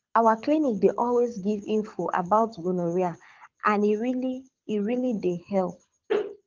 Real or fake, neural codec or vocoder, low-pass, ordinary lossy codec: fake; codec, 24 kHz, 6 kbps, HILCodec; 7.2 kHz; Opus, 24 kbps